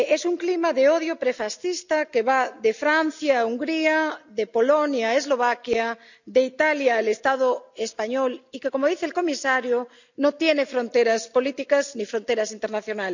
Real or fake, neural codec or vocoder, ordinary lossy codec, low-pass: real; none; none; 7.2 kHz